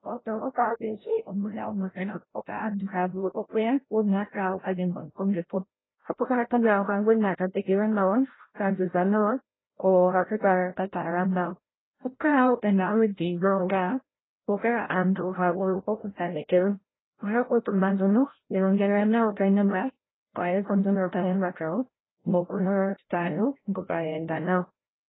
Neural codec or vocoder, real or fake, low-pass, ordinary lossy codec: codec, 16 kHz, 0.5 kbps, FreqCodec, larger model; fake; 7.2 kHz; AAC, 16 kbps